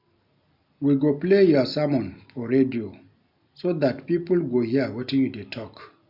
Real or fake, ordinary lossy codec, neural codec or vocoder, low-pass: real; none; none; 5.4 kHz